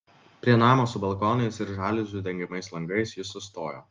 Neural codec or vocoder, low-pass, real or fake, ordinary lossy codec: none; 7.2 kHz; real; Opus, 32 kbps